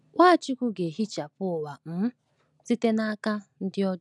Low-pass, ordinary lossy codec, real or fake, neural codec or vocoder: none; none; real; none